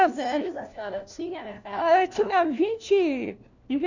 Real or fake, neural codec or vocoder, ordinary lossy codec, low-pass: fake; codec, 16 kHz, 1 kbps, FunCodec, trained on LibriTTS, 50 frames a second; none; 7.2 kHz